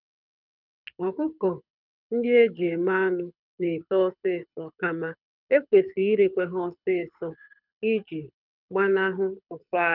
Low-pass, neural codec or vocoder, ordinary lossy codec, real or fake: 5.4 kHz; codec, 24 kHz, 6 kbps, HILCodec; none; fake